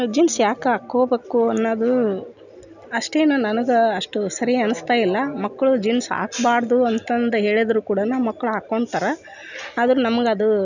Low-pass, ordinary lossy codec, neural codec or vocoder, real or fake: 7.2 kHz; none; none; real